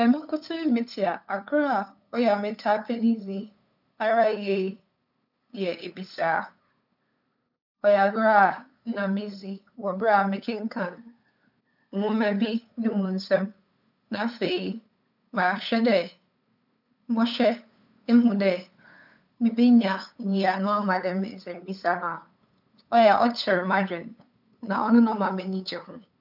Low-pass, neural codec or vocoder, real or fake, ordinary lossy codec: 5.4 kHz; codec, 16 kHz, 8 kbps, FunCodec, trained on LibriTTS, 25 frames a second; fake; none